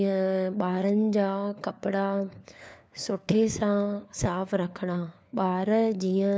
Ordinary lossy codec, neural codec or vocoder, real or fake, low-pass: none; codec, 16 kHz, 4 kbps, FunCodec, trained on LibriTTS, 50 frames a second; fake; none